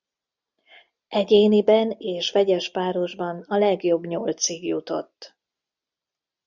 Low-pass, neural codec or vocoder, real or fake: 7.2 kHz; none; real